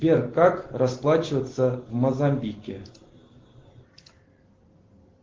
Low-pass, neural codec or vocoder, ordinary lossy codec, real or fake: 7.2 kHz; none; Opus, 16 kbps; real